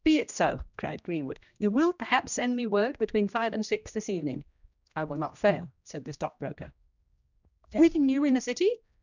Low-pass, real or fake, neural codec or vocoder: 7.2 kHz; fake; codec, 16 kHz, 1 kbps, X-Codec, HuBERT features, trained on general audio